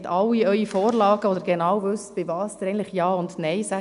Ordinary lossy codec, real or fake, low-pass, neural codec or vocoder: AAC, 96 kbps; real; 10.8 kHz; none